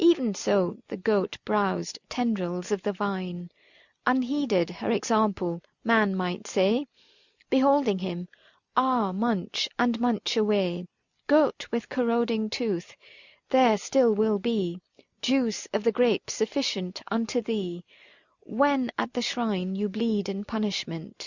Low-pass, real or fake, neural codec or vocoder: 7.2 kHz; real; none